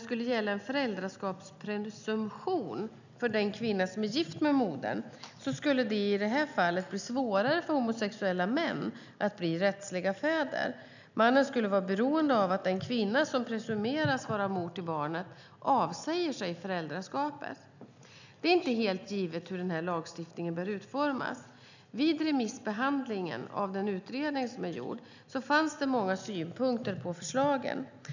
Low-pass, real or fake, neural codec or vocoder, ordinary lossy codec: 7.2 kHz; real; none; none